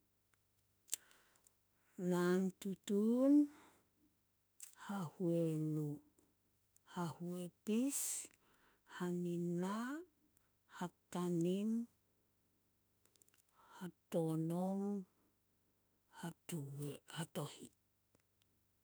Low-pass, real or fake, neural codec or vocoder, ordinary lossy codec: none; fake; autoencoder, 48 kHz, 32 numbers a frame, DAC-VAE, trained on Japanese speech; none